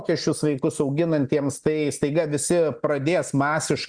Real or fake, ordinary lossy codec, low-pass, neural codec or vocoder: real; Opus, 64 kbps; 9.9 kHz; none